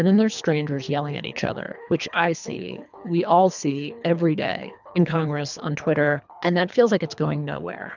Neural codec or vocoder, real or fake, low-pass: codec, 24 kHz, 3 kbps, HILCodec; fake; 7.2 kHz